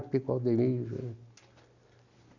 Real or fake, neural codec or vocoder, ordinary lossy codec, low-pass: real; none; none; 7.2 kHz